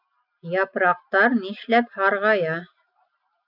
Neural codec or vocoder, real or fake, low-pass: none; real; 5.4 kHz